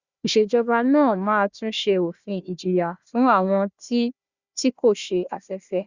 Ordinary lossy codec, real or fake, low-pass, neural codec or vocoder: Opus, 64 kbps; fake; 7.2 kHz; codec, 16 kHz, 1 kbps, FunCodec, trained on Chinese and English, 50 frames a second